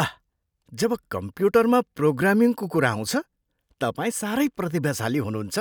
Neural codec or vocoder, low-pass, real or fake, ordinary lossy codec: none; none; real; none